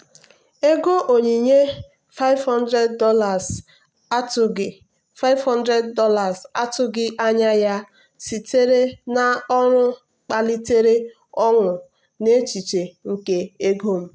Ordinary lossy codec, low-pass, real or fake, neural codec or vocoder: none; none; real; none